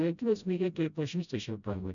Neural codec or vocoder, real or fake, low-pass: codec, 16 kHz, 0.5 kbps, FreqCodec, smaller model; fake; 7.2 kHz